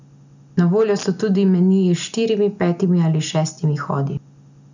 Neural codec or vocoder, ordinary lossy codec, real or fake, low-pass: none; none; real; 7.2 kHz